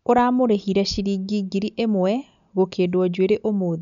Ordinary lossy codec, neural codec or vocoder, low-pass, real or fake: none; none; 7.2 kHz; real